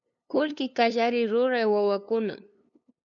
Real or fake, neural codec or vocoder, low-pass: fake; codec, 16 kHz, 2 kbps, FunCodec, trained on LibriTTS, 25 frames a second; 7.2 kHz